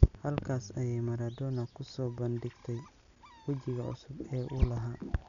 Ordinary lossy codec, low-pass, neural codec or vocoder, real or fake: none; 7.2 kHz; none; real